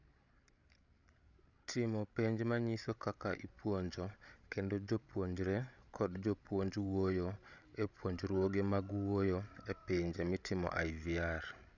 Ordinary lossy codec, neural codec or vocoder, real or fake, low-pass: none; codec, 16 kHz, 16 kbps, FreqCodec, larger model; fake; 7.2 kHz